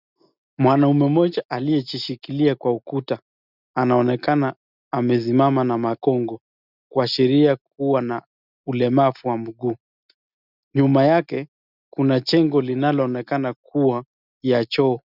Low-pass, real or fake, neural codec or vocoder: 5.4 kHz; real; none